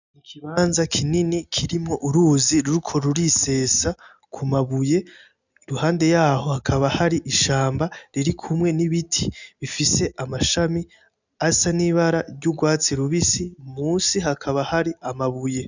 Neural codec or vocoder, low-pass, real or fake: none; 7.2 kHz; real